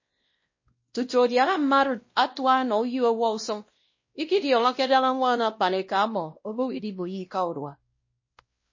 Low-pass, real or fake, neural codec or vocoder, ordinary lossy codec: 7.2 kHz; fake; codec, 16 kHz, 1 kbps, X-Codec, WavLM features, trained on Multilingual LibriSpeech; MP3, 32 kbps